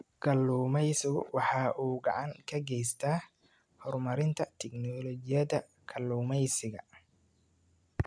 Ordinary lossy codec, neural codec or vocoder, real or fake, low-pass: none; none; real; 9.9 kHz